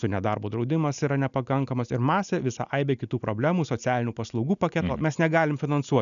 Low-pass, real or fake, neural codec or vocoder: 7.2 kHz; real; none